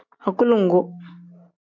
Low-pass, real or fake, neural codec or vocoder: 7.2 kHz; real; none